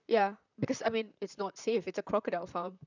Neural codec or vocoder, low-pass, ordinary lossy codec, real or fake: vocoder, 44.1 kHz, 128 mel bands, Pupu-Vocoder; 7.2 kHz; none; fake